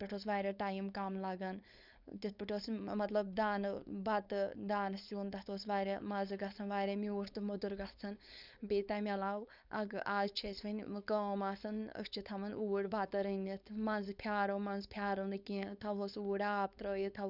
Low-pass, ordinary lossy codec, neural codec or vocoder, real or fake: 5.4 kHz; none; codec, 16 kHz, 4.8 kbps, FACodec; fake